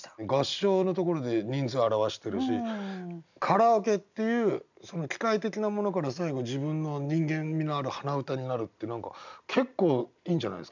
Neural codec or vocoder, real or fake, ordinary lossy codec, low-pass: none; real; none; 7.2 kHz